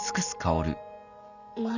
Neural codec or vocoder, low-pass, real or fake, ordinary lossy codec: none; 7.2 kHz; real; none